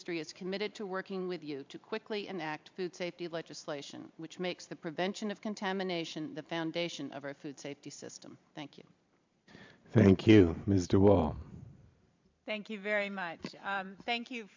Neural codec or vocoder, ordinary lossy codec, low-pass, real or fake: vocoder, 44.1 kHz, 128 mel bands every 512 samples, BigVGAN v2; MP3, 64 kbps; 7.2 kHz; fake